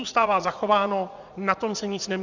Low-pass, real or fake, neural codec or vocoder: 7.2 kHz; fake; vocoder, 44.1 kHz, 80 mel bands, Vocos